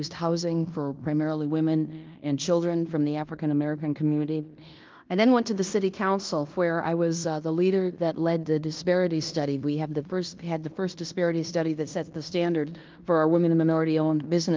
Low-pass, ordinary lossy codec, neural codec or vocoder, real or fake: 7.2 kHz; Opus, 32 kbps; codec, 16 kHz in and 24 kHz out, 0.9 kbps, LongCat-Audio-Codec, fine tuned four codebook decoder; fake